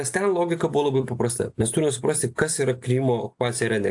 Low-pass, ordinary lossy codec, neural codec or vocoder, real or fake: 10.8 kHz; AAC, 64 kbps; none; real